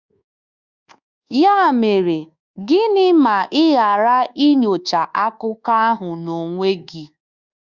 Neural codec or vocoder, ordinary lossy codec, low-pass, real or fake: codec, 24 kHz, 1.2 kbps, DualCodec; Opus, 64 kbps; 7.2 kHz; fake